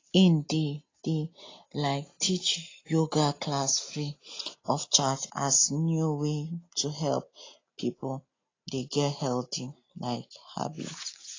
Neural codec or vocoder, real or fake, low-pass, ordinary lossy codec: none; real; 7.2 kHz; AAC, 32 kbps